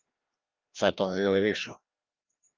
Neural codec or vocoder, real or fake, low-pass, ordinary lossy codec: codec, 16 kHz, 1 kbps, FreqCodec, larger model; fake; 7.2 kHz; Opus, 32 kbps